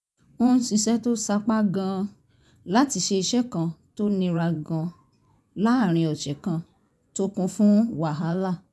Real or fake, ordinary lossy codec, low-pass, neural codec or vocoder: fake; none; none; vocoder, 24 kHz, 100 mel bands, Vocos